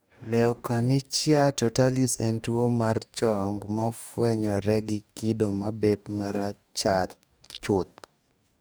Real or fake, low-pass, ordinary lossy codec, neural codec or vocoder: fake; none; none; codec, 44.1 kHz, 2.6 kbps, DAC